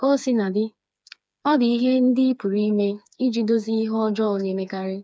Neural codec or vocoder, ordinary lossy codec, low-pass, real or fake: codec, 16 kHz, 4 kbps, FreqCodec, smaller model; none; none; fake